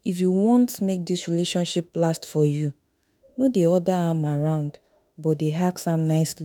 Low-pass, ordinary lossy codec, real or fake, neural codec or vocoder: none; none; fake; autoencoder, 48 kHz, 32 numbers a frame, DAC-VAE, trained on Japanese speech